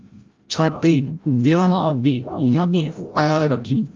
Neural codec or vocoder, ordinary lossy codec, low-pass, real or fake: codec, 16 kHz, 0.5 kbps, FreqCodec, larger model; Opus, 32 kbps; 7.2 kHz; fake